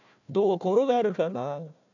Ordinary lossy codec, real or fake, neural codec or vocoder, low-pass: none; fake; codec, 16 kHz, 1 kbps, FunCodec, trained on Chinese and English, 50 frames a second; 7.2 kHz